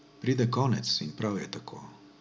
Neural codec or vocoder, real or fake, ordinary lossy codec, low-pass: none; real; none; none